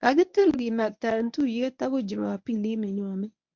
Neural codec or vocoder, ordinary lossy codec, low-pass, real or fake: codec, 24 kHz, 0.9 kbps, WavTokenizer, medium speech release version 1; MP3, 64 kbps; 7.2 kHz; fake